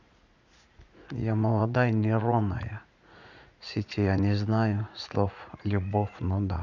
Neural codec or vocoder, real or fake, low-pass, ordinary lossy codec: vocoder, 44.1 kHz, 80 mel bands, Vocos; fake; 7.2 kHz; none